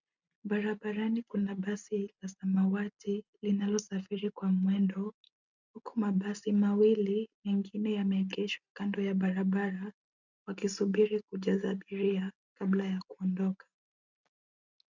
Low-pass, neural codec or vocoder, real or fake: 7.2 kHz; none; real